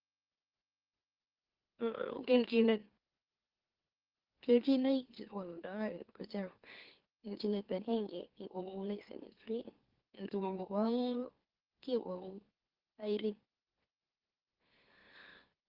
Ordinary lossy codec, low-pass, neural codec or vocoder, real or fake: Opus, 16 kbps; 5.4 kHz; autoencoder, 44.1 kHz, a latent of 192 numbers a frame, MeloTTS; fake